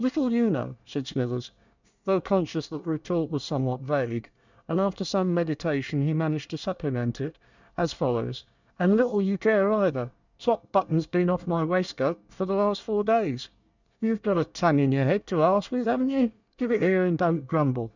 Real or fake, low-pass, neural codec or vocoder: fake; 7.2 kHz; codec, 24 kHz, 1 kbps, SNAC